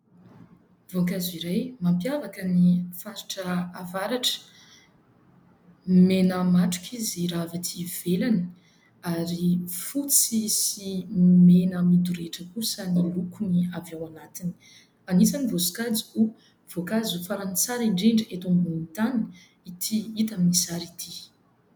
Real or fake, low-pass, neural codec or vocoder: real; 19.8 kHz; none